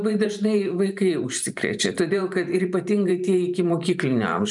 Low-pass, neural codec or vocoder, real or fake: 10.8 kHz; vocoder, 44.1 kHz, 128 mel bands every 512 samples, BigVGAN v2; fake